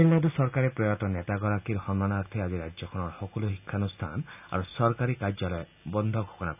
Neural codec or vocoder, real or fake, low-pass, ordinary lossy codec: none; real; 3.6 kHz; none